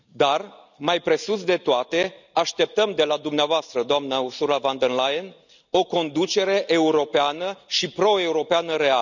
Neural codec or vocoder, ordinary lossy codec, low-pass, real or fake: none; none; 7.2 kHz; real